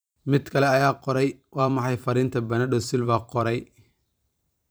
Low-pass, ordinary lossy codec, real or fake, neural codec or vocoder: none; none; fake; vocoder, 44.1 kHz, 128 mel bands every 512 samples, BigVGAN v2